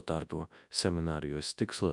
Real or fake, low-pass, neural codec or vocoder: fake; 10.8 kHz; codec, 24 kHz, 0.9 kbps, WavTokenizer, large speech release